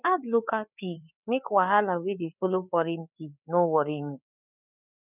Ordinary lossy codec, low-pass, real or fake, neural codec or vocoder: none; 3.6 kHz; fake; codec, 16 kHz, 4 kbps, FreqCodec, larger model